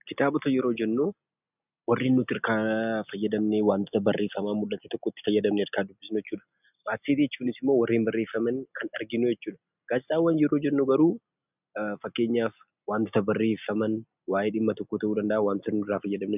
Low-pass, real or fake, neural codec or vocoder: 3.6 kHz; real; none